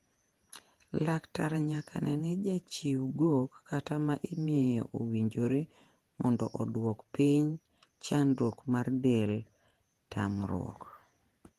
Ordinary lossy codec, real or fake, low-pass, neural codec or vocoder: Opus, 24 kbps; fake; 14.4 kHz; vocoder, 48 kHz, 128 mel bands, Vocos